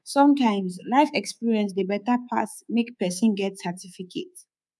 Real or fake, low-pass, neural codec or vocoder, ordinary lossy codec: fake; none; codec, 24 kHz, 3.1 kbps, DualCodec; none